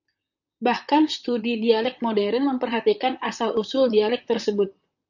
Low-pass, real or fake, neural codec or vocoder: 7.2 kHz; fake; vocoder, 44.1 kHz, 128 mel bands, Pupu-Vocoder